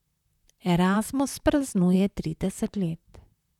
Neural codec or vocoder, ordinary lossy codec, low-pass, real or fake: vocoder, 44.1 kHz, 128 mel bands, Pupu-Vocoder; none; 19.8 kHz; fake